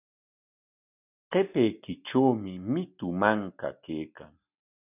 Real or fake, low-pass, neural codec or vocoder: real; 3.6 kHz; none